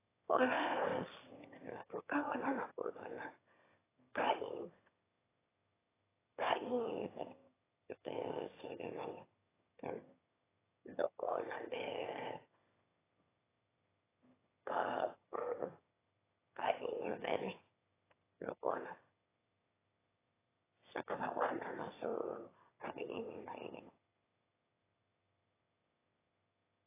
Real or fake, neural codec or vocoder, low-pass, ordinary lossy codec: fake; autoencoder, 22.05 kHz, a latent of 192 numbers a frame, VITS, trained on one speaker; 3.6 kHz; AAC, 16 kbps